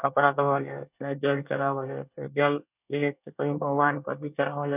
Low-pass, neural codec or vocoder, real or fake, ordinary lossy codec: 3.6 kHz; codec, 24 kHz, 1 kbps, SNAC; fake; none